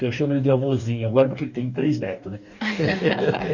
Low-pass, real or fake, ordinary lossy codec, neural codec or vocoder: 7.2 kHz; fake; none; codec, 44.1 kHz, 2.6 kbps, DAC